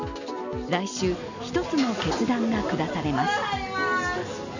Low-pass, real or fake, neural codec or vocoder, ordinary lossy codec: 7.2 kHz; real; none; none